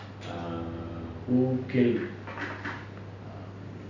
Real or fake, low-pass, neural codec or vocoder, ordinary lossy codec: real; 7.2 kHz; none; none